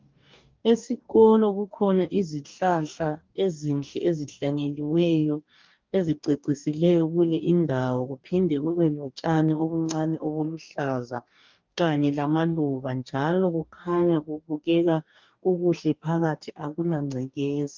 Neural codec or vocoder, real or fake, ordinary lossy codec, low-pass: codec, 44.1 kHz, 2.6 kbps, DAC; fake; Opus, 24 kbps; 7.2 kHz